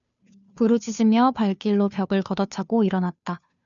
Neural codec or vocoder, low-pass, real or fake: codec, 16 kHz, 2 kbps, FunCodec, trained on Chinese and English, 25 frames a second; 7.2 kHz; fake